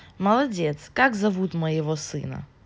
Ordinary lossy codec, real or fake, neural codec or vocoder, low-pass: none; real; none; none